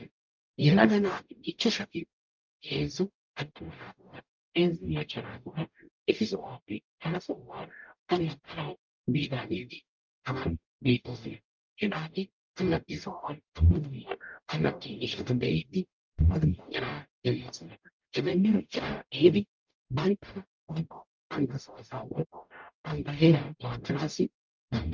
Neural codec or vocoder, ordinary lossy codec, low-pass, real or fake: codec, 44.1 kHz, 0.9 kbps, DAC; Opus, 24 kbps; 7.2 kHz; fake